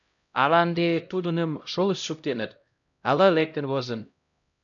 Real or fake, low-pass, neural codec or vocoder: fake; 7.2 kHz; codec, 16 kHz, 0.5 kbps, X-Codec, HuBERT features, trained on LibriSpeech